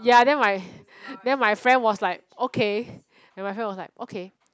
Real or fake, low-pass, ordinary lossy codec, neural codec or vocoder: real; none; none; none